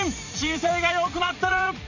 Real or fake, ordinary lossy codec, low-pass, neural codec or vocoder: fake; none; 7.2 kHz; vocoder, 44.1 kHz, 80 mel bands, Vocos